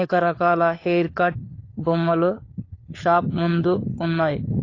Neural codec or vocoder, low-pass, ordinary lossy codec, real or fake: codec, 16 kHz, 4 kbps, FunCodec, trained on LibriTTS, 50 frames a second; 7.2 kHz; AAC, 32 kbps; fake